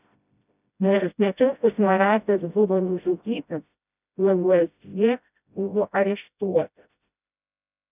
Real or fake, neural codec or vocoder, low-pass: fake; codec, 16 kHz, 0.5 kbps, FreqCodec, smaller model; 3.6 kHz